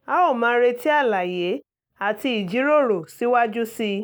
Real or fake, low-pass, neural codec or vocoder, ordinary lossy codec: real; none; none; none